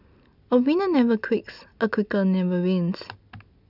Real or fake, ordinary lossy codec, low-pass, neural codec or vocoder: real; none; 5.4 kHz; none